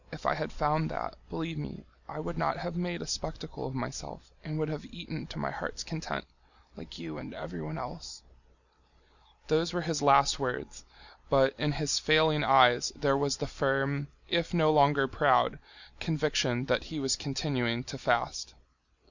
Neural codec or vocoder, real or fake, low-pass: none; real; 7.2 kHz